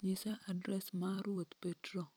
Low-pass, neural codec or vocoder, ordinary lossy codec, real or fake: none; vocoder, 44.1 kHz, 128 mel bands every 256 samples, BigVGAN v2; none; fake